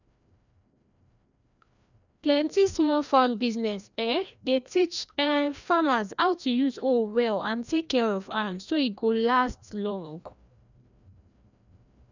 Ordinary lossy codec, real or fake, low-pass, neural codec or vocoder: none; fake; 7.2 kHz; codec, 16 kHz, 1 kbps, FreqCodec, larger model